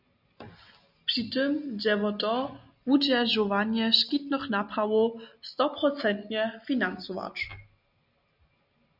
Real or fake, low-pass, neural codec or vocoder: real; 5.4 kHz; none